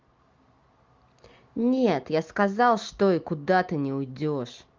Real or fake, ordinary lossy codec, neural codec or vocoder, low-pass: real; Opus, 32 kbps; none; 7.2 kHz